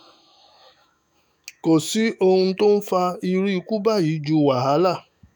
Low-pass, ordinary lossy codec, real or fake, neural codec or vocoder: none; none; fake; autoencoder, 48 kHz, 128 numbers a frame, DAC-VAE, trained on Japanese speech